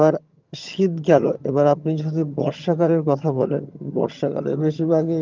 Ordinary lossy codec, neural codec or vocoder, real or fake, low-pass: Opus, 24 kbps; vocoder, 22.05 kHz, 80 mel bands, HiFi-GAN; fake; 7.2 kHz